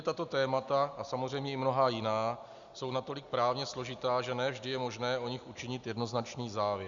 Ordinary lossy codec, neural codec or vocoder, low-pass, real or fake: Opus, 64 kbps; none; 7.2 kHz; real